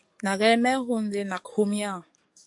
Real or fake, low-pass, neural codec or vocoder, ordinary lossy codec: fake; 10.8 kHz; codec, 44.1 kHz, 7.8 kbps, DAC; AAC, 64 kbps